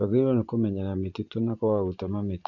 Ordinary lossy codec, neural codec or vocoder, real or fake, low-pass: AAC, 48 kbps; vocoder, 24 kHz, 100 mel bands, Vocos; fake; 7.2 kHz